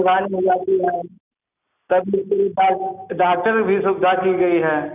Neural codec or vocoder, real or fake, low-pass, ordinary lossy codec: none; real; 3.6 kHz; none